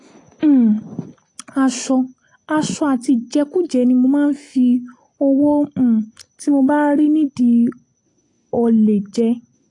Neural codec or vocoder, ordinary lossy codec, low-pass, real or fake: none; AAC, 48 kbps; 10.8 kHz; real